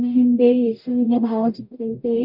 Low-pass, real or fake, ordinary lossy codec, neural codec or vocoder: 5.4 kHz; fake; none; codec, 44.1 kHz, 0.9 kbps, DAC